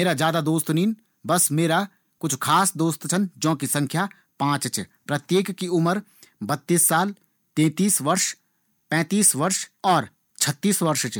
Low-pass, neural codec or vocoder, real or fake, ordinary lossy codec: none; none; real; none